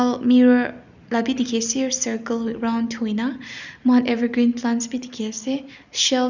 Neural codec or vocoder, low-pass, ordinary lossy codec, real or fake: none; 7.2 kHz; none; real